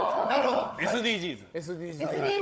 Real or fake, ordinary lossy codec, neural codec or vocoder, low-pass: fake; none; codec, 16 kHz, 16 kbps, FunCodec, trained on Chinese and English, 50 frames a second; none